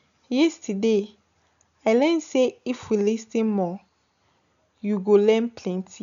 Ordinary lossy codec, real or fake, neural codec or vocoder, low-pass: none; real; none; 7.2 kHz